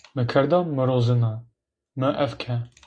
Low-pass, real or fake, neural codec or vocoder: 9.9 kHz; real; none